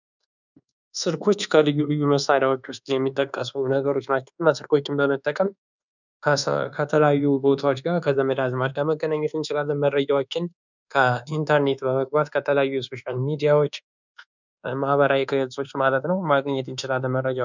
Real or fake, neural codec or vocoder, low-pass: fake; codec, 24 kHz, 1.2 kbps, DualCodec; 7.2 kHz